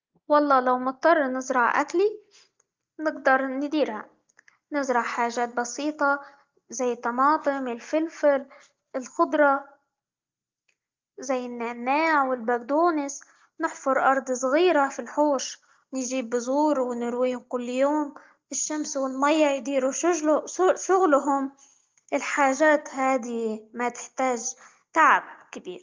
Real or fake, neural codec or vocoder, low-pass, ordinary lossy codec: real; none; 7.2 kHz; Opus, 32 kbps